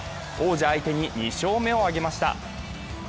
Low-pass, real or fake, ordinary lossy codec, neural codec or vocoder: none; real; none; none